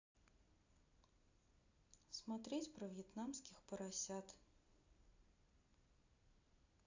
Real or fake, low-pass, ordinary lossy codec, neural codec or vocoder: real; 7.2 kHz; AAC, 48 kbps; none